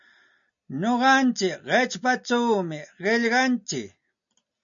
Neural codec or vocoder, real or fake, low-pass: none; real; 7.2 kHz